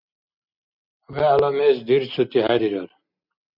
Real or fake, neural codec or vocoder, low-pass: real; none; 5.4 kHz